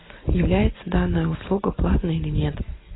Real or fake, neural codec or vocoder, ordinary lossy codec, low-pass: real; none; AAC, 16 kbps; 7.2 kHz